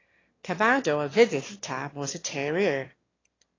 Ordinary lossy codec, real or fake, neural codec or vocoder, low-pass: AAC, 32 kbps; fake; autoencoder, 22.05 kHz, a latent of 192 numbers a frame, VITS, trained on one speaker; 7.2 kHz